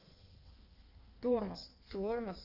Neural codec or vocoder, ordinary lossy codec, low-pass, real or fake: codec, 16 kHz in and 24 kHz out, 1.1 kbps, FireRedTTS-2 codec; none; 5.4 kHz; fake